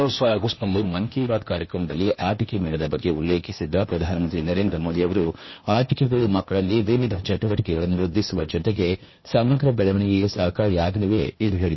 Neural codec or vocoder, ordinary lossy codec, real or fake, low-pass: codec, 16 kHz, 0.8 kbps, ZipCodec; MP3, 24 kbps; fake; 7.2 kHz